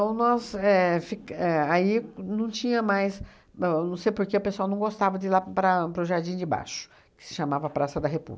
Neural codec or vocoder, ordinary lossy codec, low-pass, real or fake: none; none; none; real